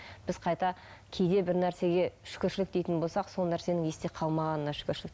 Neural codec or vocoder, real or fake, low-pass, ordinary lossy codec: none; real; none; none